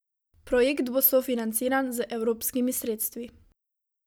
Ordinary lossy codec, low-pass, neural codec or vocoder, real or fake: none; none; none; real